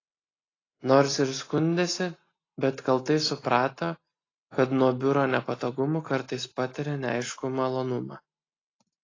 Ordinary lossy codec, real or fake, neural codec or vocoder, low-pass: AAC, 32 kbps; real; none; 7.2 kHz